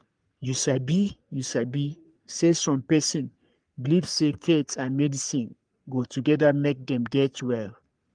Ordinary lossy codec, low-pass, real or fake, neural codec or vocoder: Opus, 32 kbps; 9.9 kHz; fake; codec, 44.1 kHz, 3.4 kbps, Pupu-Codec